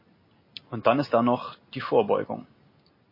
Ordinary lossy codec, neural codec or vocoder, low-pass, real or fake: MP3, 24 kbps; none; 5.4 kHz; real